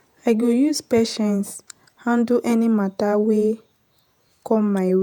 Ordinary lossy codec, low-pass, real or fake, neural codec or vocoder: none; 19.8 kHz; fake; vocoder, 48 kHz, 128 mel bands, Vocos